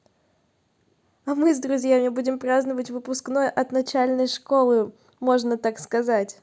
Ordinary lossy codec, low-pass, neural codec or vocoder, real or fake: none; none; none; real